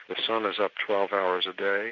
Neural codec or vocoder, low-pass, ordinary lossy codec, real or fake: none; 7.2 kHz; AAC, 48 kbps; real